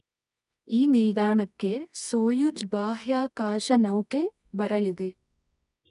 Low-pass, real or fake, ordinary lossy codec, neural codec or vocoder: 10.8 kHz; fake; none; codec, 24 kHz, 0.9 kbps, WavTokenizer, medium music audio release